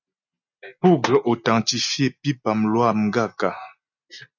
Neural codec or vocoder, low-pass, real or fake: none; 7.2 kHz; real